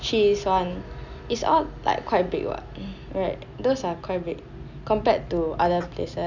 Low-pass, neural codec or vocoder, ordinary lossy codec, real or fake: 7.2 kHz; none; none; real